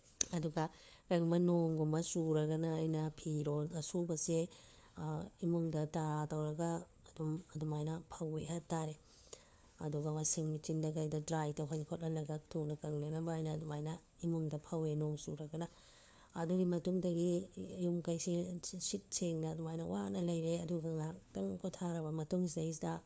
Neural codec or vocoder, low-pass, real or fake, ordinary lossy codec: codec, 16 kHz, 4 kbps, FunCodec, trained on LibriTTS, 50 frames a second; none; fake; none